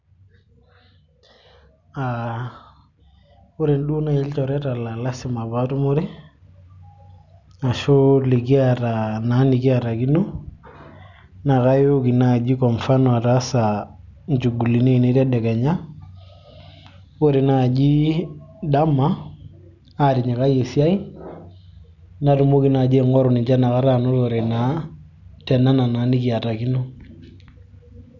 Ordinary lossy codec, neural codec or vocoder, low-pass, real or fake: none; none; 7.2 kHz; real